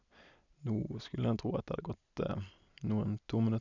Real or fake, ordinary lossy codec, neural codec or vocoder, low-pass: real; none; none; 7.2 kHz